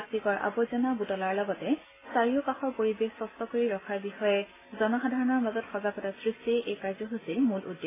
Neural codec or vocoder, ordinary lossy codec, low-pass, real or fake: none; AAC, 16 kbps; 3.6 kHz; real